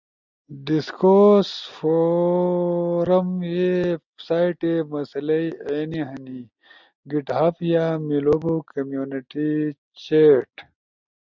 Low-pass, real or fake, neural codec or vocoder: 7.2 kHz; real; none